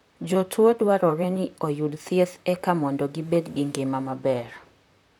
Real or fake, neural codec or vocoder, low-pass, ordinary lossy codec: fake; vocoder, 44.1 kHz, 128 mel bands, Pupu-Vocoder; 19.8 kHz; none